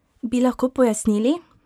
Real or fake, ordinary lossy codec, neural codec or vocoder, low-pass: real; none; none; 19.8 kHz